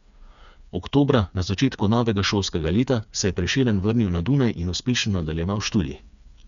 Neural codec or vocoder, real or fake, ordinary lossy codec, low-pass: codec, 16 kHz, 4 kbps, FreqCodec, smaller model; fake; none; 7.2 kHz